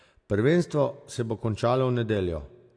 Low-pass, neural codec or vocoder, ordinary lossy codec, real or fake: 9.9 kHz; none; AAC, 48 kbps; real